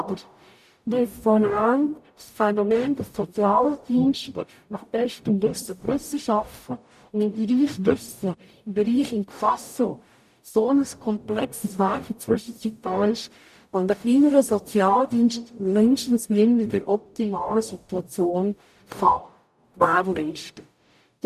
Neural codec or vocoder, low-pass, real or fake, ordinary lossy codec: codec, 44.1 kHz, 0.9 kbps, DAC; 14.4 kHz; fake; none